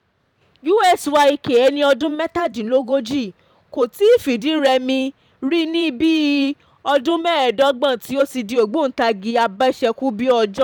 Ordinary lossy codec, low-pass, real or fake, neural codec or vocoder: none; 19.8 kHz; fake; vocoder, 44.1 kHz, 128 mel bands every 512 samples, BigVGAN v2